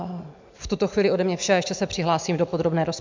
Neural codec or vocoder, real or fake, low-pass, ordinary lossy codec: none; real; 7.2 kHz; MP3, 64 kbps